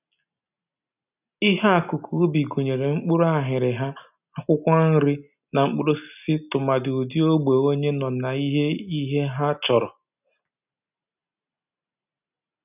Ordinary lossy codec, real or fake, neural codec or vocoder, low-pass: none; real; none; 3.6 kHz